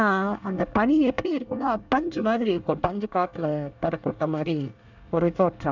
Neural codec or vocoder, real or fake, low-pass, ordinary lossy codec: codec, 24 kHz, 1 kbps, SNAC; fake; 7.2 kHz; none